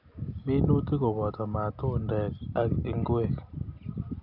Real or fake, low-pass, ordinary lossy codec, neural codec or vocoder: real; 5.4 kHz; none; none